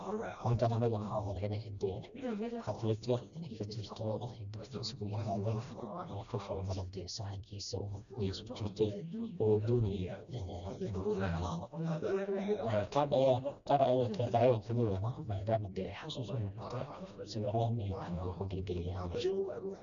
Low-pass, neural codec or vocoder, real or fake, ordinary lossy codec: 7.2 kHz; codec, 16 kHz, 1 kbps, FreqCodec, smaller model; fake; none